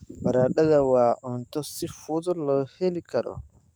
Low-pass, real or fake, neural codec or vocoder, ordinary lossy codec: none; fake; codec, 44.1 kHz, 7.8 kbps, DAC; none